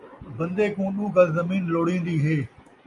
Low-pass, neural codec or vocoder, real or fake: 10.8 kHz; none; real